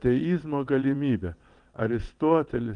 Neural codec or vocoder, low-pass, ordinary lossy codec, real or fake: vocoder, 22.05 kHz, 80 mel bands, WaveNeXt; 9.9 kHz; Opus, 32 kbps; fake